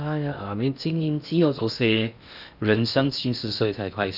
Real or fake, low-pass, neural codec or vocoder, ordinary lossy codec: fake; 5.4 kHz; codec, 16 kHz in and 24 kHz out, 0.6 kbps, FocalCodec, streaming, 2048 codes; none